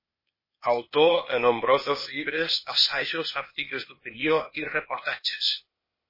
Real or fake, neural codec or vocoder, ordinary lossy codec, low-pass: fake; codec, 16 kHz, 0.8 kbps, ZipCodec; MP3, 24 kbps; 5.4 kHz